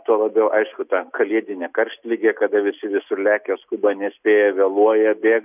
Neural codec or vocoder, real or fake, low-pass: none; real; 3.6 kHz